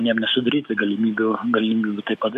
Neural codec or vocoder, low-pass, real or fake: codec, 44.1 kHz, 7.8 kbps, DAC; 14.4 kHz; fake